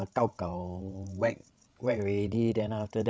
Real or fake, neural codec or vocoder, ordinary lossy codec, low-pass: fake; codec, 16 kHz, 16 kbps, FreqCodec, larger model; none; none